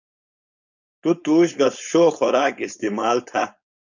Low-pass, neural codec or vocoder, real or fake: 7.2 kHz; codec, 16 kHz, 4.8 kbps, FACodec; fake